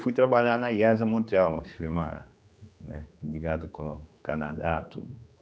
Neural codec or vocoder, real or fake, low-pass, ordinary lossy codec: codec, 16 kHz, 2 kbps, X-Codec, HuBERT features, trained on general audio; fake; none; none